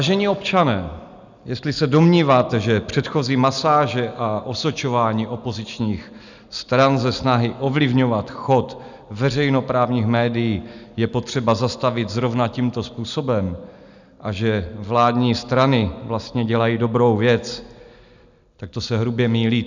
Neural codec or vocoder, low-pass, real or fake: none; 7.2 kHz; real